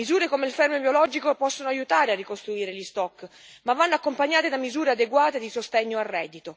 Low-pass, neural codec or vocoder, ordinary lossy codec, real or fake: none; none; none; real